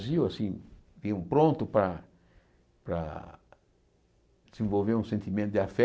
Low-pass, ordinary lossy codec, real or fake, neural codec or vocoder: none; none; real; none